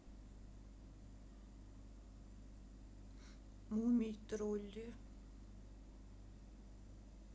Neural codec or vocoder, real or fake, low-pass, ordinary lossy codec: none; real; none; none